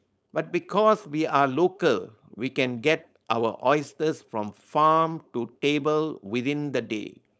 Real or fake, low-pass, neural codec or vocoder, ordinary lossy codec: fake; none; codec, 16 kHz, 4.8 kbps, FACodec; none